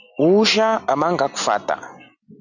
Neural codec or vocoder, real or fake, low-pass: none; real; 7.2 kHz